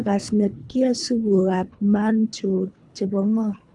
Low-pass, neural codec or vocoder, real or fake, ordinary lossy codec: 10.8 kHz; codec, 24 kHz, 3 kbps, HILCodec; fake; none